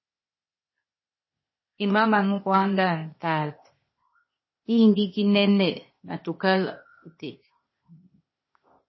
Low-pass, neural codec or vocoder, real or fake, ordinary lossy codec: 7.2 kHz; codec, 16 kHz, 0.8 kbps, ZipCodec; fake; MP3, 24 kbps